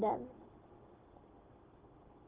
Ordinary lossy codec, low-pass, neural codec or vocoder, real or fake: Opus, 16 kbps; 3.6 kHz; none; real